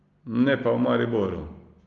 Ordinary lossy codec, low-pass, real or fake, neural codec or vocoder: Opus, 32 kbps; 7.2 kHz; real; none